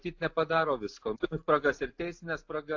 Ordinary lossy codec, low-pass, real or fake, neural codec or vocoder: AAC, 48 kbps; 7.2 kHz; real; none